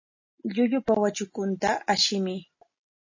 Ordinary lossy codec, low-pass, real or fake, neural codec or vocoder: MP3, 32 kbps; 7.2 kHz; real; none